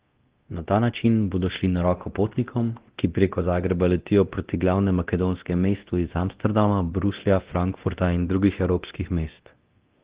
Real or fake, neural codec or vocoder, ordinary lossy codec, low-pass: fake; codec, 24 kHz, 0.9 kbps, DualCodec; Opus, 16 kbps; 3.6 kHz